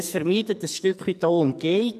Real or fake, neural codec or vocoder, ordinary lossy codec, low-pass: fake; codec, 44.1 kHz, 2.6 kbps, SNAC; none; 14.4 kHz